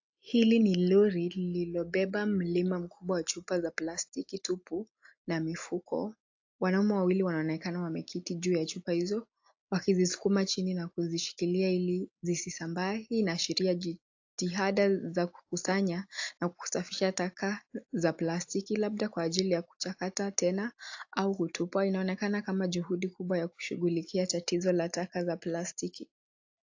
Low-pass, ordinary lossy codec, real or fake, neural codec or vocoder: 7.2 kHz; AAC, 48 kbps; real; none